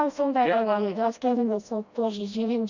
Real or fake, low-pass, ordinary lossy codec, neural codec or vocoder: fake; 7.2 kHz; none; codec, 16 kHz, 1 kbps, FreqCodec, smaller model